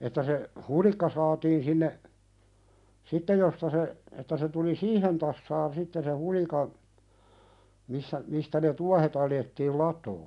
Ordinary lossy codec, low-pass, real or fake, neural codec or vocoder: none; 10.8 kHz; real; none